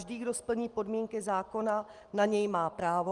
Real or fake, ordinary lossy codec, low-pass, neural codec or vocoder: real; Opus, 24 kbps; 10.8 kHz; none